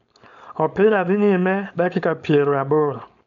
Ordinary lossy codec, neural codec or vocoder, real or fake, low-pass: none; codec, 16 kHz, 4.8 kbps, FACodec; fake; 7.2 kHz